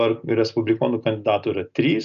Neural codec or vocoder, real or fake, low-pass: none; real; 7.2 kHz